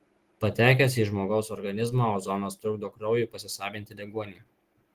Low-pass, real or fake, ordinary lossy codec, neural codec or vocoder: 14.4 kHz; real; Opus, 16 kbps; none